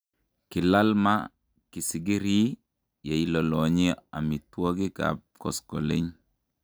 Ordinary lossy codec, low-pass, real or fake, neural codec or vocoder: none; none; real; none